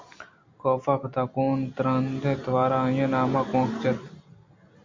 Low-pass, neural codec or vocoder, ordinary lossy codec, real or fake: 7.2 kHz; none; MP3, 48 kbps; real